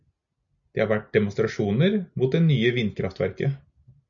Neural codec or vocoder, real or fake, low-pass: none; real; 7.2 kHz